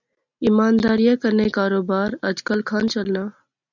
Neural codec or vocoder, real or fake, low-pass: none; real; 7.2 kHz